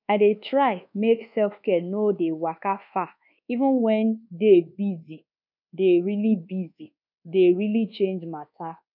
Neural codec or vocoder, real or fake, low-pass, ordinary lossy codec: codec, 24 kHz, 1.2 kbps, DualCodec; fake; 5.4 kHz; none